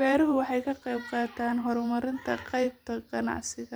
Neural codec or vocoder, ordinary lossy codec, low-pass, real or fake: vocoder, 44.1 kHz, 128 mel bands every 512 samples, BigVGAN v2; none; none; fake